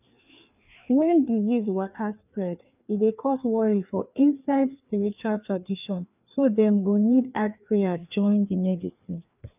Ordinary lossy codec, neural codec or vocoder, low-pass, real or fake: none; codec, 16 kHz, 2 kbps, FreqCodec, larger model; 3.6 kHz; fake